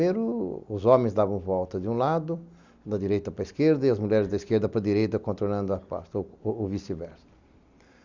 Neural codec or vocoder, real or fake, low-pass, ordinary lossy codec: none; real; 7.2 kHz; Opus, 64 kbps